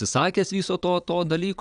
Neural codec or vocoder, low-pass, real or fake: vocoder, 22.05 kHz, 80 mel bands, Vocos; 9.9 kHz; fake